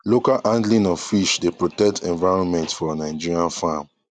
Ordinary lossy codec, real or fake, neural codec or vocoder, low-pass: none; real; none; 9.9 kHz